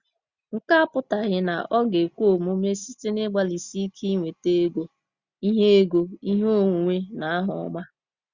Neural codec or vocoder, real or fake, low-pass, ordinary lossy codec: none; real; 7.2 kHz; Opus, 64 kbps